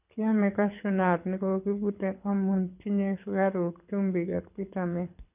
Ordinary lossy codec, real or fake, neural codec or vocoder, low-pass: none; fake; codec, 24 kHz, 6 kbps, HILCodec; 3.6 kHz